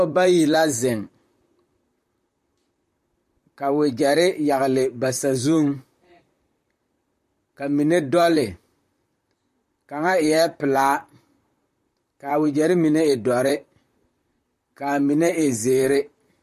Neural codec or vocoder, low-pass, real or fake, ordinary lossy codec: vocoder, 44.1 kHz, 128 mel bands, Pupu-Vocoder; 14.4 kHz; fake; MP3, 64 kbps